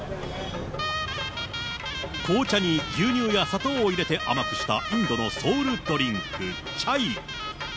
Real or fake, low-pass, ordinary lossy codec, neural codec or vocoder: real; none; none; none